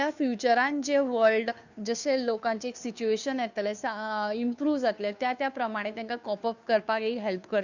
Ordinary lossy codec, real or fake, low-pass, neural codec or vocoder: none; fake; 7.2 kHz; codec, 16 kHz, 2 kbps, FunCodec, trained on Chinese and English, 25 frames a second